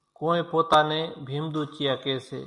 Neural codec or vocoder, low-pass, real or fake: none; 10.8 kHz; real